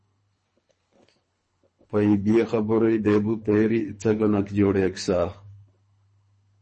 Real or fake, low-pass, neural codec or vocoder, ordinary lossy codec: fake; 10.8 kHz; codec, 24 kHz, 3 kbps, HILCodec; MP3, 32 kbps